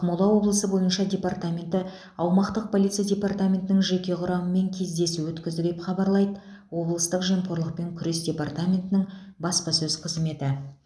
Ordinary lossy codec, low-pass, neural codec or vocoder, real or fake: none; none; none; real